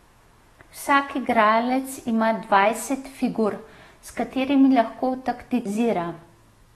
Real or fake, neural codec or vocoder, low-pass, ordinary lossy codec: fake; autoencoder, 48 kHz, 128 numbers a frame, DAC-VAE, trained on Japanese speech; 19.8 kHz; AAC, 32 kbps